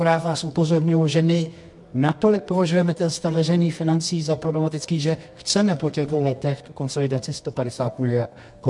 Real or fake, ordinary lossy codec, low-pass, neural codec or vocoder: fake; MP3, 64 kbps; 10.8 kHz; codec, 24 kHz, 0.9 kbps, WavTokenizer, medium music audio release